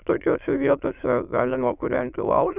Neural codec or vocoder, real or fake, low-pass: autoencoder, 22.05 kHz, a latent of 192 numbers a frame, VITS, trained on many speakers; fake; 3.6 kHz